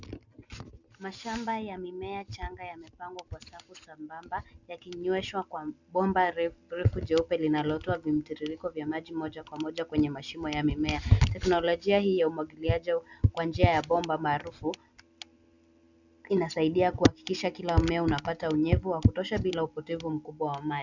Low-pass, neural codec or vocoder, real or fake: 7.2 kHz; none; real